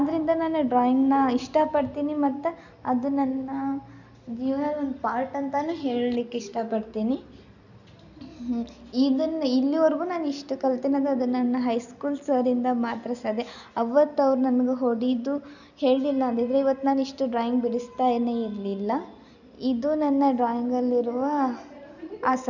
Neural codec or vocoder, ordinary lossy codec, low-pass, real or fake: none; none; 7.2 kHz; real